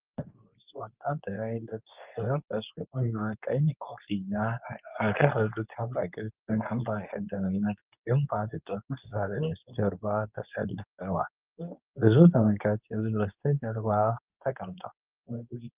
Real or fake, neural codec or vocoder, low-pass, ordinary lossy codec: fake; codec, 24 kHz, 0.9 kbps, WavTokenizer, medium speech release version 2; 3.6 kHz; Opus, 24 kbps